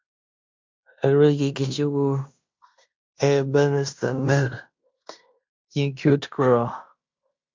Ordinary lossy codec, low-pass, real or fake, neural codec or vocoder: MP3, 64 kbps; 7.2 kHz; fake; codec, 16 kHz in and 24 kHz out, 0.9 kbps, LongCat-Audio-Codec, fine tuned four codebook decoder